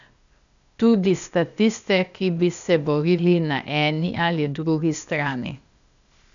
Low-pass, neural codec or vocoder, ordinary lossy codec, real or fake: 7.2 kHz; codec, 16 kHz, 0.8 kbps, ZipCodec; none; fake